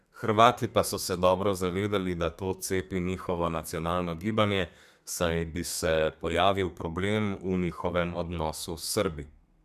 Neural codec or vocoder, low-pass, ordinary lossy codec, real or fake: codec, 32 kHz, 1.9 kbps, SNAC; 14.4 kHz; none; fake